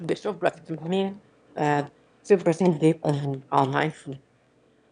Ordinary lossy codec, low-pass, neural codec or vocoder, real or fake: none; 9.9 kHz; autoencoder, 22.05 kHz, a latent of 192 numbers a frame, VITS, trained on one speaker; fake